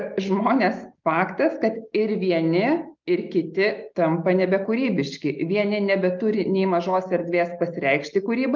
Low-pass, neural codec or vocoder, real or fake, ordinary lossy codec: 7.2 kHz; none; real; Opus, 24 kbps